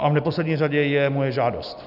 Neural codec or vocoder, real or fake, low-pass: none; real; 5.4 kHz